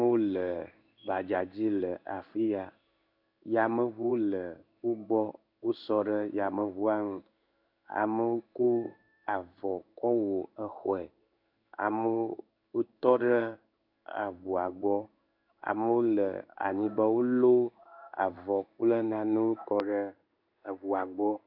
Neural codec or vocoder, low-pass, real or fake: codec, 16 kHz in and 24 kHz out, 1 kbps, XY-Tokenizer; 5.4 kHz; fake